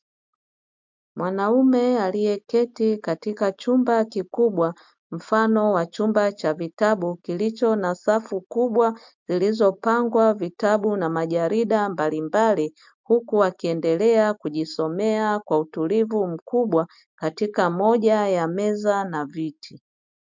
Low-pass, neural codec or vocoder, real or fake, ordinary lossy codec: 7.2 kHz; none; real; MP3, 64 kbps